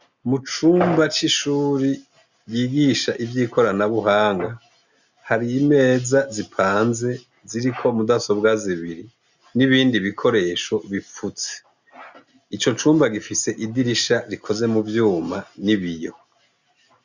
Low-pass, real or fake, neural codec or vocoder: 7.2 kHz; real; none